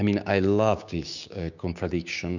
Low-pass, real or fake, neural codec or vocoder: 7.2 kHz; fake; codec, 16 kHz, 6 kbps, DAC